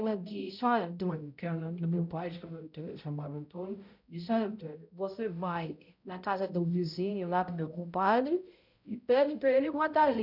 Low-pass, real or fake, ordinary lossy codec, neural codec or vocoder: 5.4 kHz; fake; none; codec, 16 kHz, 0.5 kbps, X-Codec, HuBERT features, trained on balanced general audio